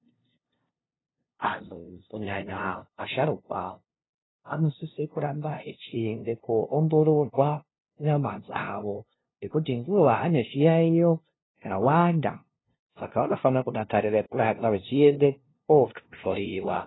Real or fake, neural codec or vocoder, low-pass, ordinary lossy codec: fake; codec, 16 kHz, 0.5 kbps, FunCodec, trained on LibriTTS, 25 frames a second; 7.2 kHz; AAC, 16 kbps